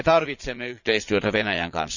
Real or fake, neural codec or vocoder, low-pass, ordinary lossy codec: fake; vocoder, 22.05 kHz, 80 mel bands, Vocos; 7.2 kHz; none